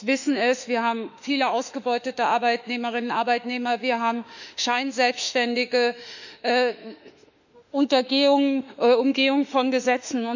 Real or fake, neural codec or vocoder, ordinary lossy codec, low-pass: fake; autoencoder, 48 kHz, 32 numbers a frame, DAC-VAE, trained on Japanese speech; none; 7.2 kHz